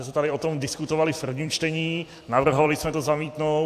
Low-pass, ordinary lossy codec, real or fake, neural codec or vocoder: 14.4 kHz; AAC, 64 kbps; fake; vocoder, 44.1 kHz, 128 mel bands every 256 samples, BigVGAN v2